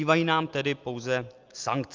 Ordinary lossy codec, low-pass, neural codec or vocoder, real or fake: Opus, 32 kbps; 7.2 kHz; none; real